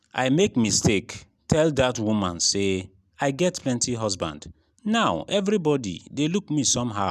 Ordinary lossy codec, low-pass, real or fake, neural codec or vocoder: none; 14.4 kHz; real; none